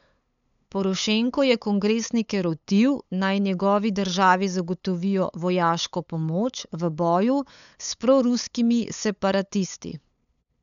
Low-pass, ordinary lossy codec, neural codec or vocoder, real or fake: 7.2 kHz; none; codec, 16 kHz, 8 kbps, FunCodec, trained on LibriTTS, 25 frames a second; fake